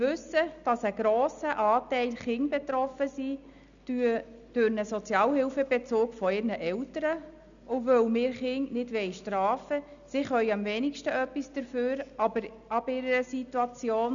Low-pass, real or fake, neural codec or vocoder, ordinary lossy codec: 7.2 kHz; real; none; none